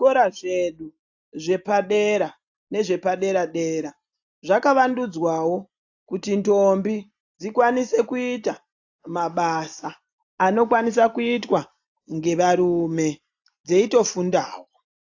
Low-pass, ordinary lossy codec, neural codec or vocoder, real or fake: 7.2 kHz; AAC, 48 kbps; none; real